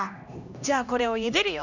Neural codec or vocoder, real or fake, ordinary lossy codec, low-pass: codec, 16 kHz, 1 kbps, X-Codec, HuBERT features, trained on LibriSpeech; fake; none; 7.2 kHz